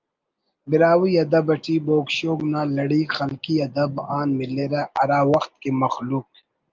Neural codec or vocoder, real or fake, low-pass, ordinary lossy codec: none; real; 7.2 kHz; Opus, 32 kbps